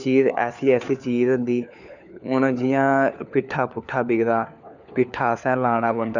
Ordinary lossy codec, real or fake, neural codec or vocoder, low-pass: none; fake; codec, 16 kHz, 4 kbps, FunCodec, trained on LibriTTS, 50 frames a second; 7.2 kHz